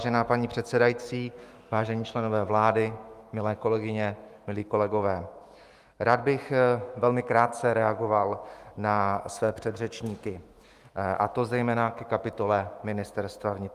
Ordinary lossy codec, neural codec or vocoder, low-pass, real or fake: Opus, 32 kbps; autoencoder, 48 kHz, 128 numbers a frame, DAC-VAE, trained on Japanese speech; 14.4 kHz; fake